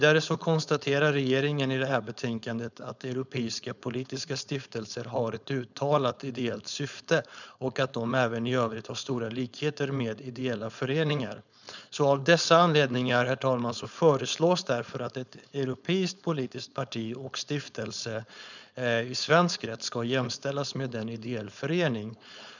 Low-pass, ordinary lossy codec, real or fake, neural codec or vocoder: 7.2 kHz; none; fake; codec, 16 kHz, 4.8 kbps, FACodec